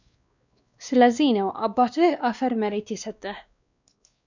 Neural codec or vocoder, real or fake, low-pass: codec, 16 kHz, 2 kbps, X-Codec, WavLM features, trained on Multilingual LibriSpeech; fake; 7.2 kHz